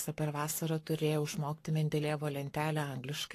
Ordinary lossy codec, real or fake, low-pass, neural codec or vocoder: AAC, 48 kbps; fake; 14.4 kHz; codec, 44.1 kHz, 7.8 kbps, Pupu-Codec